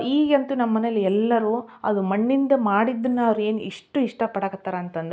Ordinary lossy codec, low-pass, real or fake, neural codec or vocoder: none; none; real; none